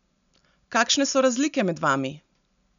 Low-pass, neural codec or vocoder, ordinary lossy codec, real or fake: 7.2 kHz; none; none; real